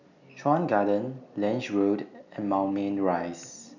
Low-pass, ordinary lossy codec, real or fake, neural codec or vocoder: 7.2 kHz; none; real; none